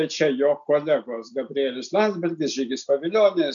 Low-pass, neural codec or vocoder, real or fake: 7.2 kHz; none; real